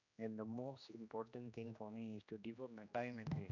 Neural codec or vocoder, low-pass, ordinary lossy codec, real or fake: codec, 16 kHz, 1 kbps, X-Codec, HuBERT features, trained on general audio; 7.2 kHz; none; fake